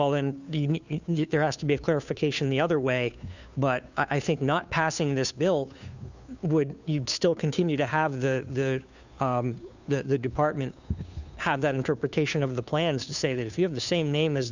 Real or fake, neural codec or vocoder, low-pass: fake; codec, 16 kHz, 2 kbps, FunCodec, trained on Chinese and English, 25 frames a second; 7.2 kHz